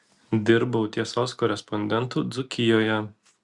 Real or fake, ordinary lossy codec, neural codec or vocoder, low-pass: real; Opus, 64 kbps; none; 10.8 kHz